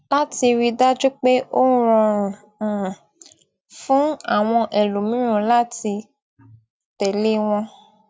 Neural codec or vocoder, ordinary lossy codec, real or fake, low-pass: none; none; real; none